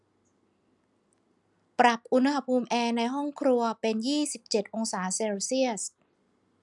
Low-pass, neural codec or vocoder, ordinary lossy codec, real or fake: 10.8 kHz; none; none; real